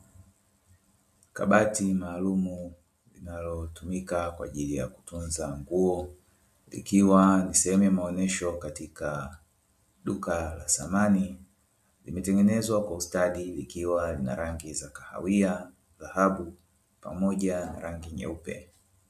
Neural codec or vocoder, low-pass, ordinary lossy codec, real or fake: none; 14.4 kHz; MP3, 64 kbps; real